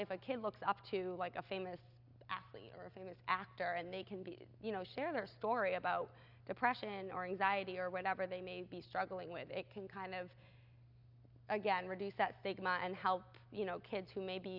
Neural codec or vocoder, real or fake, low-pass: none; real; 5.4 kHz